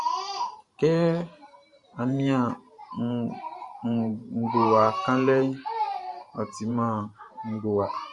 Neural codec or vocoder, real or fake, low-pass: vocoder, 44.1 kHz, 128 mel bands every 512 samples, BigVGAN v2; fake; 10.8 kHz